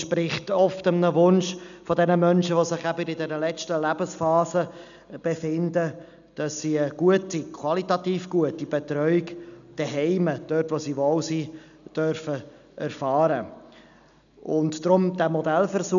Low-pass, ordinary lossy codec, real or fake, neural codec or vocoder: 7.2 kHz; none; real; none